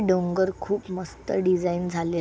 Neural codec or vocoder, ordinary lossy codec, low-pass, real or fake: none; none; none; real